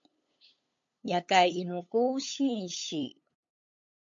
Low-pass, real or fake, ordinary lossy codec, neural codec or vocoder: 7.2 kHz; fake; MP3, 48 kbps; codec, 16 kHz, 8 kbps, FunCodec, trained on LibriTTS, 25 frames a second